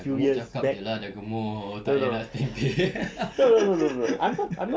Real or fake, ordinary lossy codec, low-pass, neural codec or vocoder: real; none; none; none